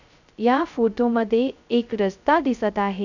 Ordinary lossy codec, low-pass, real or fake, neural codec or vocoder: none; 7.2 kHz; fake; codec, 16 kHz, 0.2 kbps, FocalCodec